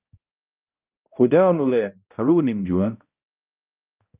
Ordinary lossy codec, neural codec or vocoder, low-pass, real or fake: Opus, 24 kbps; codec, 16 kHz, 0.5 kbps, X-Codec, HuBERT features, trained on balanced general audio; 3.6 kHz; fake